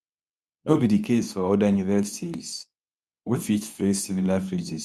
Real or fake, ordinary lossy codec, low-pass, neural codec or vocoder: fake; none; none; codec, 24 kHz, 0.9 kbps, WavTokenizer, medium speech release version 2